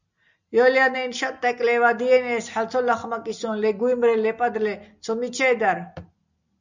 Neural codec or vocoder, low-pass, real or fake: none; 7.2 kHz; real